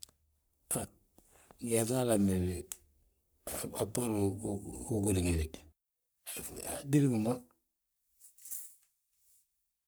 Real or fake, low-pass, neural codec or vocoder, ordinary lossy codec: fake; none; codec, 44.1 kHz, 3.4 kbps, Pupu-Codec; none